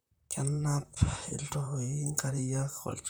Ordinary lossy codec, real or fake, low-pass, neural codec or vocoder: none; fake; none; vocoder, 44.1 kHz, 128 mel bands, Pupu-Vocoder